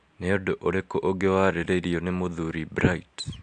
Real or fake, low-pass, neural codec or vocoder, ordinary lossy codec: real; 9.9 kHz; none; AAC, 64 kbps